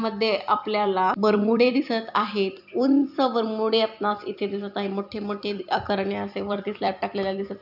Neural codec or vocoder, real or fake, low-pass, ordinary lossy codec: vocoder, 44.1 kHz, 128 mel bands every 256 samples, BigVGAN v2; fake; 5.4 kHz; none